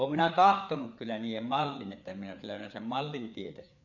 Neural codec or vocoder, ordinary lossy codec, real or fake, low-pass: codec, 16 kHz, 4 kbps, FreqCodec, larger model; none; fake; 7.2 kHz